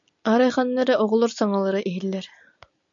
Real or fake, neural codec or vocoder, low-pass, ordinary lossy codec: real; none; 7.2 kHz; MP3, 64 kbps